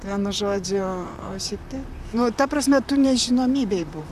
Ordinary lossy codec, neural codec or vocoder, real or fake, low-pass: AAC, 96 kbps; vocoder, 44.1 kHz, 128 mel bands, Pupu-Vocoder; fake; 14.4 kHz